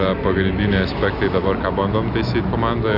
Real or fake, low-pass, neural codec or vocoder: real; 5.4 kHz; none